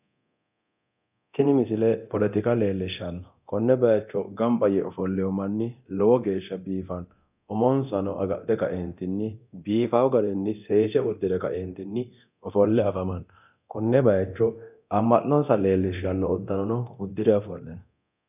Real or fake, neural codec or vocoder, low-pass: fake; codec, 24 kHz, 0.9 kbps, DualCodec; 3.6 kHz